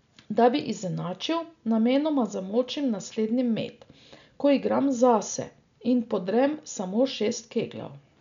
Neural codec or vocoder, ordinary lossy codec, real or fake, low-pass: none; none; real; 7.2 kHz